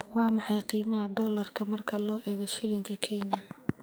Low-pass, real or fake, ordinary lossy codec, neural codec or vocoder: none; fake; none; codec, 44.1 kHz, 2.6 kbps, SNAC